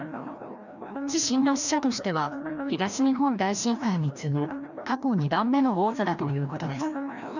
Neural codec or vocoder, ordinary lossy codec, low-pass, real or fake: codec, 16 kHz, 1 kbps, FreqCodec, larger model; none; 7.2 kHz; fake